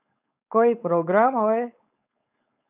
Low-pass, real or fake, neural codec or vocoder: 3.6 kHz; fake; codec, 16 kHz, 4.8 kbps, FACodec